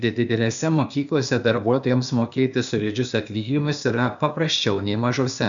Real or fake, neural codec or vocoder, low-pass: fake; codec, 16 kHz, 0.8 kbps, ZipCodec; 7.2 kHz